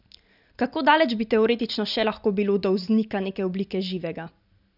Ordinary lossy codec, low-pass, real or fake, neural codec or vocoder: none; 5.4 kHz; real; none